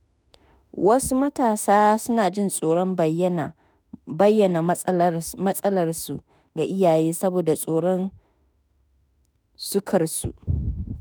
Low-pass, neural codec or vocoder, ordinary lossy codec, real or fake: none; autoencoder, 48 kHz, 32 numbers a frame, DAC-VAE, trained on Japanese speech; none; fake